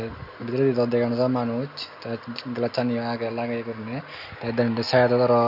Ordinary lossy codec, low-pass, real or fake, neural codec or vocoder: none; 5.4 kHz; real; none